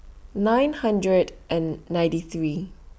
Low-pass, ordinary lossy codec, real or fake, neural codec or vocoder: none; none; real; none